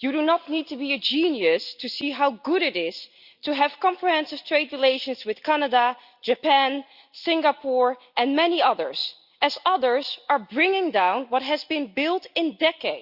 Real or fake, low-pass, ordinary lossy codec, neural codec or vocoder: real; 5.4 kHz; Opus, 64 kbps; none